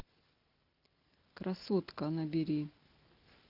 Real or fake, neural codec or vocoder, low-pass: real; none; 5.4 kHz